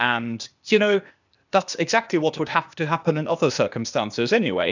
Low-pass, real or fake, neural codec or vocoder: 7.2 kHz; fake; codec, 16 kHz, 0.8 kbps, ZipCodec